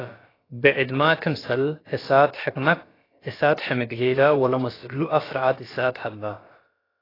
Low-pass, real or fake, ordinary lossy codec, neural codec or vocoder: 5.4 kHz; fake; AAC, 24 kbps; codec, 16 kHz, about 1 kbps, DyCAST, with the encoder's durations